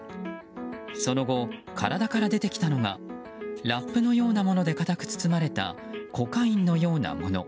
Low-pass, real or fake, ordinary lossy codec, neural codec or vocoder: none; real; none; none